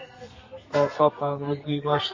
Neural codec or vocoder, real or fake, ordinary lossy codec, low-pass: codec, 32 kHz, 1.9 kbps, SNAC; fake; MP3, 32 kbps; 7.2 kHz